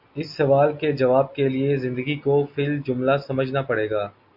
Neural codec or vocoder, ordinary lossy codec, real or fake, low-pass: none; AAC, 48 kbps; real; 5.4 kHz